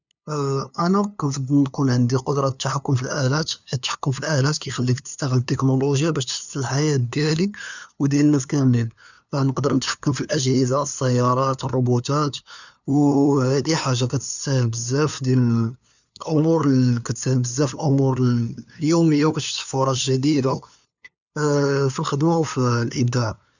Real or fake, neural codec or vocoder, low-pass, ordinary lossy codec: fake; codec, 16 kHz, 2 kbps, FunCodec, trained on LibriTTS, 25 frames a second; 7.2 kHz; none